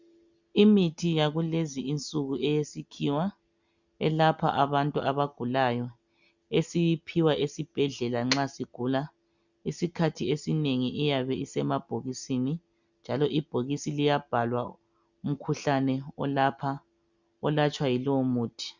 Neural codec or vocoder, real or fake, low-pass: none; real; 7.2 kHz